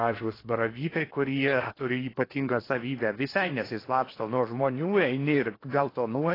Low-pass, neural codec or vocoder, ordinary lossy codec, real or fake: 5.4 kHz; codec, 16 kHz in and 24 kHz out, 0.8 kbps, FocalCodec, streaming, 65536 codes; AAC, 24 kbps; fake